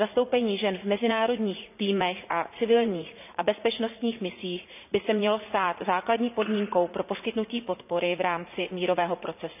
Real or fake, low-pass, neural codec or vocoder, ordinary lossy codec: fake; 3.6 kHz; vocoder, 44.1 kHz, 80 mel bands, Vocos; none